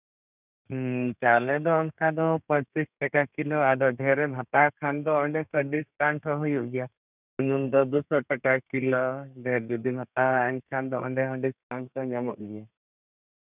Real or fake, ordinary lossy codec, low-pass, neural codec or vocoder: fake; none; 3.6 kHz; codec, 32 kHz, 1.9 kbps, SNAC